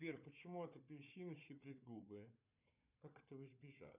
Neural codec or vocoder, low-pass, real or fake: codec, 16 kHz, 4 kbps, FunCodec, trained on Chinese and English, 50 frames a second; 3.6 kHz; fake